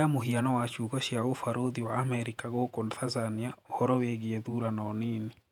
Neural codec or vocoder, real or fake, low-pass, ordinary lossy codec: vocoder, 48 kHz, 128 mel bands, Vocos; fake; 19.8 kHz; none